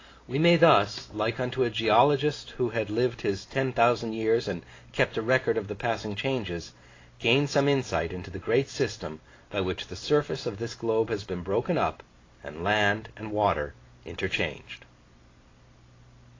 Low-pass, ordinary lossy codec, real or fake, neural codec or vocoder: 7.2 kHz; AAC, 32 kbps; real; none